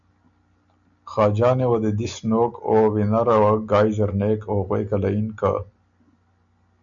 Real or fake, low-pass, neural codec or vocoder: real; 7.2 kHz; none